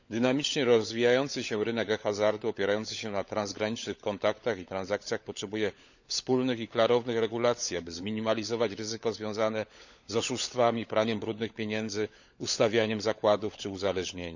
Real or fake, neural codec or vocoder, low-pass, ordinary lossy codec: fake; codec, 16 kHz, 16 kbps, FunCodec, trained on LibriTTS, 50 frames a second; 7.2 kHz; none